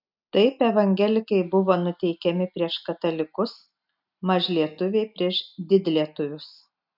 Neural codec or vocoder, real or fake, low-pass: none; real; 5.4 kHz